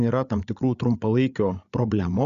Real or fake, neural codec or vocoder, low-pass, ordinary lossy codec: fake; codec, 16 kHz, 8 kbps, FreqCodec, larger model; 7.2 kHz; Opus, 64 kbps